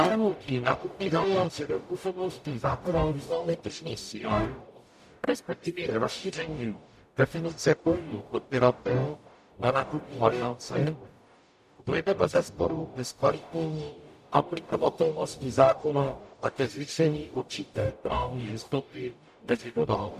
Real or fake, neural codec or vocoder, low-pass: fake; codec, 44.1 kHz, 0.9 kbps, DAC; 14.4 kHz